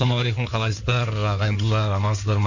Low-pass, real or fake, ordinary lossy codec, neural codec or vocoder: 7.2 kHz; fake; none; codec, 16 kHz in and 24 kHz out, 2.2 kbps, FireRedTTS-2 codec